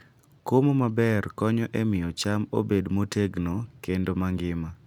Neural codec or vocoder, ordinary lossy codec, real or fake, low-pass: none; none; real; 19.8 kHz